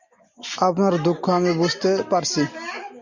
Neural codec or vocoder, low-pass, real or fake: none; 7.2 kHz; real